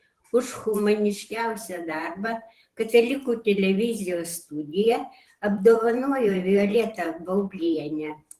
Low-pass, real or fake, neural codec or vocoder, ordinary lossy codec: 14.4 kHz; fake; vocoder, 44.1 kHz, 128 mel bands every 512 samples, BigVGAN v2; Opus, 16 kbps